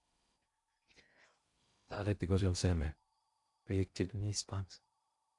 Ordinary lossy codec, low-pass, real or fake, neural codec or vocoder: MP3, 96 kbps; 10.8 kHz; fake; codec, 16 kHz in and 24 kHz out, 0.6 kbps, FocalCodec, streaming, 2048 codes